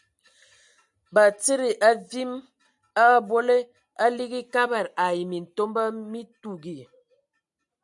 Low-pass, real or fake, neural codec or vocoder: 10.8 kHz; real; none